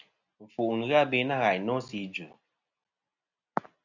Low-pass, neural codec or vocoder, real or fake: 7.2 kHz; none; real